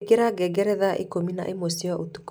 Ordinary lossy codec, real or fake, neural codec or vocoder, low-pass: none; real; none; none